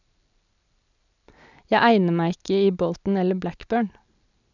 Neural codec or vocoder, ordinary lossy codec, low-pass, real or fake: none; none; 7.2 kHz; real